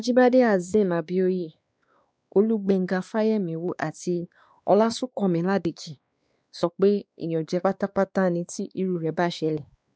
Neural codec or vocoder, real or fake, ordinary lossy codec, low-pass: codec, 16 kHz, 2 kbps, X-Codec, WavLM features, trained on Multilingual LibriSpeech; fake; none; none